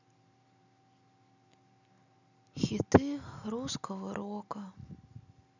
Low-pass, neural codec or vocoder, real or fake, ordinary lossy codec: 7.2 kHz; none; real; none